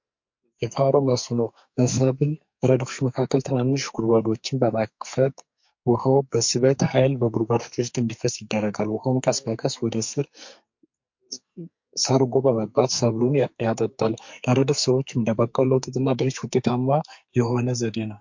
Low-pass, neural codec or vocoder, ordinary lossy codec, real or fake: 7.2 kHz; codec, 44.1 kHz, 2.6 kbps, SNAC; MP3, 48 kbps; fake